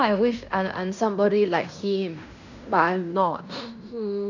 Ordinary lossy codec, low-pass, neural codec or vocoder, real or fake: none; 7.2 kHz; codec, 16 kHz in and 24 kHz out, 0.9 kbps, LongCat-Audio-Codec, fine tuned four codebook decoder; fake